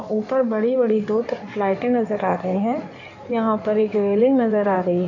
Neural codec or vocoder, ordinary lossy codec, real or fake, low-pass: codec, 16 kHz in and 24 kHz out, 2.2 kbps, FireRedTTS-2 codec; none; fake; 7.2 kHz